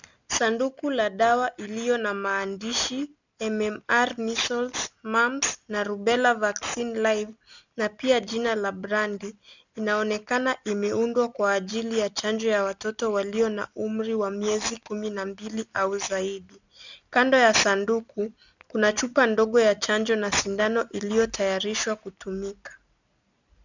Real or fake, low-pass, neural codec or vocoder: real; 7.2 kHz; none